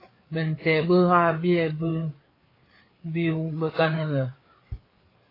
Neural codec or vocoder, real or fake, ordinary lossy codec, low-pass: codec, 16 kHz, 4 kbps, FreqCodec, larger model; fake; AAC, 24 kbps; 5.4 kHz